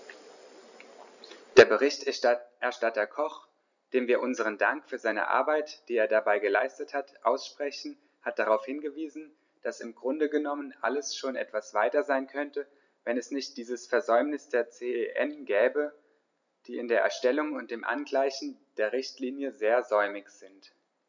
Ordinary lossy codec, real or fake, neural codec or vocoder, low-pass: none; real; none; 7.2 kHz